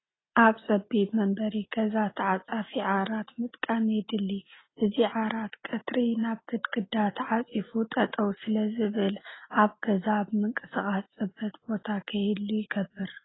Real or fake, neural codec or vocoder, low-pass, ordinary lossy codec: real; none; 7.2 kHz; AAC, 16 kbps